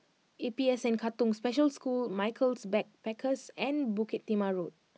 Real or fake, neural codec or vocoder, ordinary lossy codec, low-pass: real; none; none; none